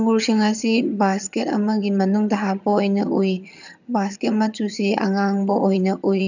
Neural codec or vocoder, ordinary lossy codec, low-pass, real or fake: vocoder, 22.05 kHz, 80 mel bands, HiFi-GAN; none; 7.2 kHz; fake